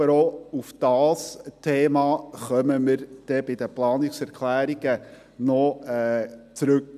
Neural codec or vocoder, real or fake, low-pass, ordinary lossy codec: none; real; 14.4 kHz; none